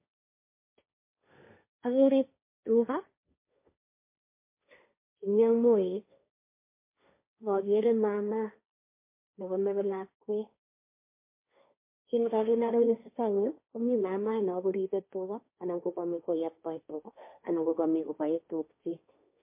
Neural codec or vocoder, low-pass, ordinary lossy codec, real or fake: codec, 16 kHz, 1.1 kbps, Voila-Tokenizer; 3.6 kHz; MP3, 24 kbps; fake